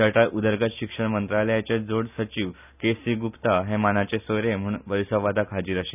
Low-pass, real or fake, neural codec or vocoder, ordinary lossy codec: 3.6 kHz; real; none; none